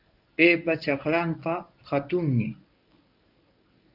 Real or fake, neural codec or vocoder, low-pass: fake; codec, 24 kHz, 0.9 kbps, WavTokenizer, medium speech release version 1; 5.4 kHz